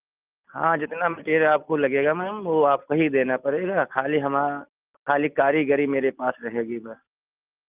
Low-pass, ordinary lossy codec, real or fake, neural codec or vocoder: 3.6 kHz; Opus, 24 kbps; real; none